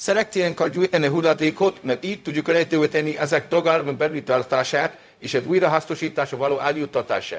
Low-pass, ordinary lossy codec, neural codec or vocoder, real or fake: none; none; codec, 16 kHz, 0.4 kbps, LongCat-Audio-Codec; fake